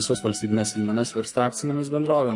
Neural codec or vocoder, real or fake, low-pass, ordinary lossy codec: codec, 44.1 kHz, 2.6 kbps, SNAC; fake; 10.8 kHz; MP3, 48 kbps